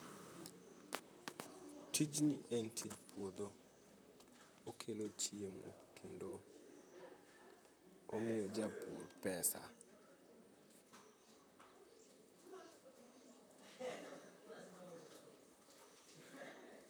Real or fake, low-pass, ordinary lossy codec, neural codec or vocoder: fake; none; none; vocoder, 44.1 kHz, 128 mel bands, Pupu-Vocoder